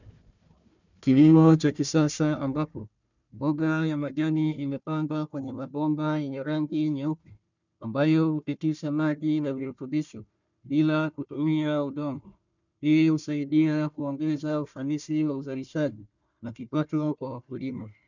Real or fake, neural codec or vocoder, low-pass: fake; codec, 16 kHz, 1 kbps, FunCodec, trained on Chinese and English, 50 frames a second; 7.2 kHz